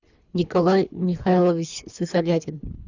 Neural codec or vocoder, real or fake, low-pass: codec, 24 kHz, 3 kbps, HILCodec; fake; 7.2 kHz